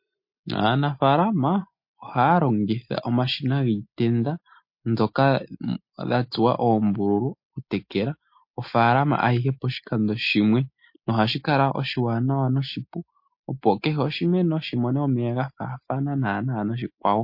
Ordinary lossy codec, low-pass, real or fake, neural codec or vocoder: MP3, 32 kbps; 5.4 kHz; real; none